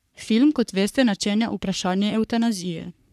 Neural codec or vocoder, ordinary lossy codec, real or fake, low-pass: codec, 44.1 kHz, 3.4 kbps, Pupu-Codec; none; fake; 14.4 kHz